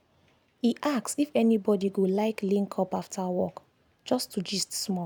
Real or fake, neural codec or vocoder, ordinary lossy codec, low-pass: real; none; none; none